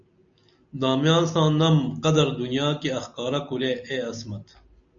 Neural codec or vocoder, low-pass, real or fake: none; 7.2 kHz; real